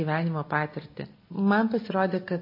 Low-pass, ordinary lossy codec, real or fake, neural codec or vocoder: 5.4 kHz; MP3, 24 kbps; real; none